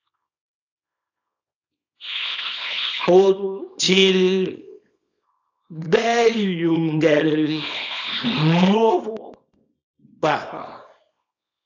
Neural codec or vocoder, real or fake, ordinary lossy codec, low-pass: codec, 24 kHz, 0.9 kbps, WavTokenizer, small release; fake; AAC, 48 kbps; 7.2 kHz